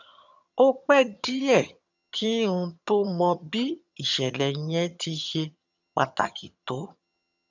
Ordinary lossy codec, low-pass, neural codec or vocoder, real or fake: none; 7.2 kHz; vocoder, 22.05 kHz, 80 mel bands, HiFi-GAN; fake